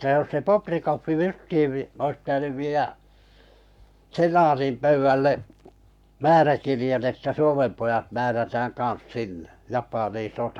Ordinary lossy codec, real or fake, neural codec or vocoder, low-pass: none; fake; codec, 44.1 kHz, 7.8 kbps, Pupu-Codec; 19.8 kHz